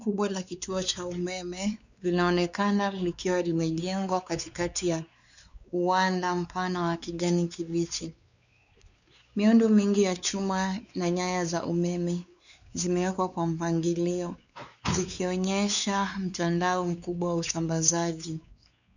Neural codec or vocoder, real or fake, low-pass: codec, 16 kHz, 4 kbps, X-Codec, WavLM features, trained on Multilingual LibriSpeech; fake; 7.2 kHz